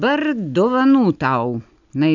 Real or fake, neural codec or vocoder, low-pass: real; none; 7.2 kHz